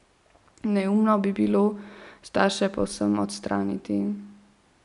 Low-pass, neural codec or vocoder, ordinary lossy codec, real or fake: 10.8 kHz; none; none; real